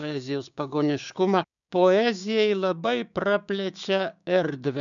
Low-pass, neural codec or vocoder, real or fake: 7.2 kHz; codec, 16 kHz, 6 kbps, DAC; fake